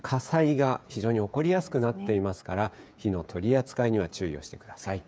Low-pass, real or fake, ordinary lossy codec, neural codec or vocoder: none; fake; none; codec, 16 kHz, 16 kbps, FreqCodec, smaller model